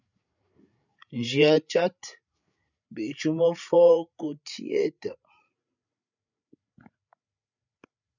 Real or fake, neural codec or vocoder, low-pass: fake; codec, 16 kHz, 8 kbps, FreqCodec, larger model; 7.2 kHz